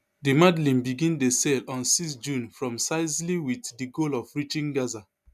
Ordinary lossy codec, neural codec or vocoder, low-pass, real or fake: none; none; 14.4 kHz; real